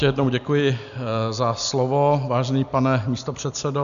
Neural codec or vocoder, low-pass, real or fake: none; 7.2 kHz; real